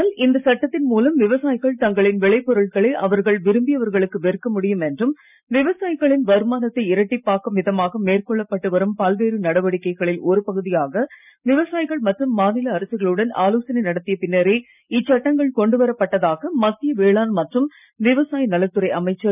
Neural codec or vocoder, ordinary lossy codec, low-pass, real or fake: none; none; 3.6 kHz; real